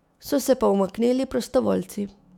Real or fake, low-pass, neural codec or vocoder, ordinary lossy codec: fake; 19.8 kHz; autoencoder, 48 kHz, 128 numbers a frame, DAC-VAE, trained on Japanese speech; none